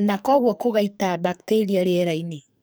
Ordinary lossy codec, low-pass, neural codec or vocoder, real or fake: none; none; codec, 44.1 kHz, 2.6 kbps, SNAC; fake